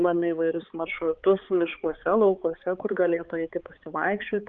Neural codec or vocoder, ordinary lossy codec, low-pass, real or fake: codec, 16 kHz, 4 kbps, X-Codec, HuBERT features, trained on balanced general audio; Opus, 24 kbps; 7.2 kHz; fake